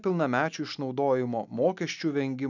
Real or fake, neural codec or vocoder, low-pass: real; none; 7.2 kHz